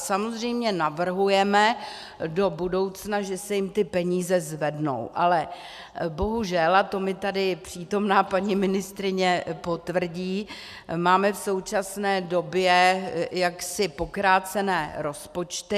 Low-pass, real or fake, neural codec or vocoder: 14.4 kHz; real; none